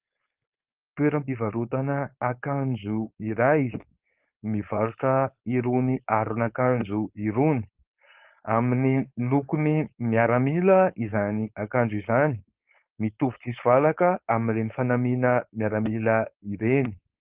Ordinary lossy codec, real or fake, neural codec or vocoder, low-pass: Opus, 32 kbps; fake; codec, 16 kHz, 4.8 kbps, FACodec; 3.6 kHz